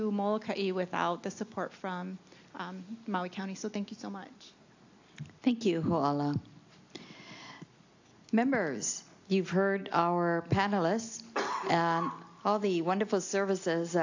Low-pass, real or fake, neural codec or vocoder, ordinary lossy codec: 7.2 kHz; real; none; AAC, 48 kbps